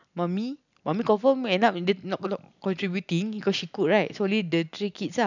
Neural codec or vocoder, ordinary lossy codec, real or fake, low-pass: none; none; real; 7.2 kHz